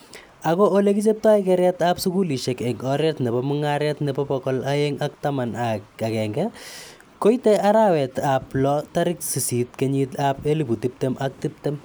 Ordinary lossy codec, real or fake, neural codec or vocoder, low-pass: none; real; none; none